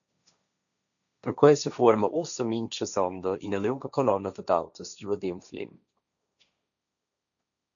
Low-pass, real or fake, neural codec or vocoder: 7.2 kHz; fake; codec, 16 kHz, 1.1 kbps, Voila-Tokenizer